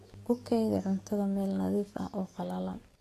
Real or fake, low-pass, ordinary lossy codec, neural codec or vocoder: fake; 14.4 kHz; AAC, 48 kbps; codec, 44.1 kHz, 7.8 kbps, DAC